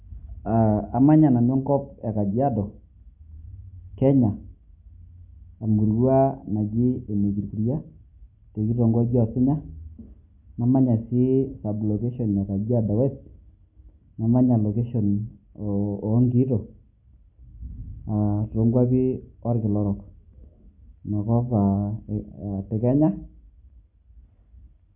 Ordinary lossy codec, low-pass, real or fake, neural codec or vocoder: MP3, 32 kbps; 3.6 kHz; real; none